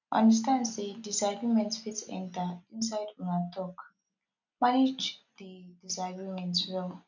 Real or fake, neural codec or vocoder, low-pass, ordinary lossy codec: real; none; 7.2 kHz; none